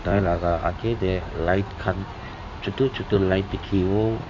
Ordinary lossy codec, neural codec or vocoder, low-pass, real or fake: MP3, 64 kbps; codec, 16 kHz in and 24 kHz out, 2.2 kbps, FireRedTTS-2 codec; 7.2 kHz; fake